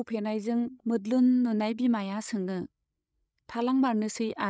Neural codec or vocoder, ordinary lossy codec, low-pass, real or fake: codec, 16 kHz, 8 kbps, FreqCodec, larger model; none; none; fake